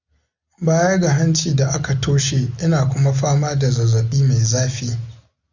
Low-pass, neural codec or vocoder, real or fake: 7.2 kHz; none; real